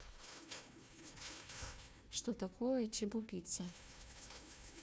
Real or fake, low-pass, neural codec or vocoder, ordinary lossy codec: fake; none; codec, 16 kHz, 1 kbps, FunCodec, trained on Chinese and English, 50 frames a second; none